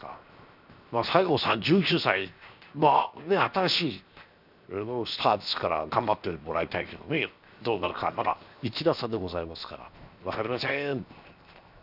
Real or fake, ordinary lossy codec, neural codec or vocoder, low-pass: fake; none; codec, 16 kHz, 0.7 kbps, FocalCodec; 5.4 kHz